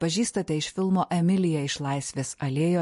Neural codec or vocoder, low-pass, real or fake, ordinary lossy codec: none; 14.4 kHz; real; MP3, 48 kbps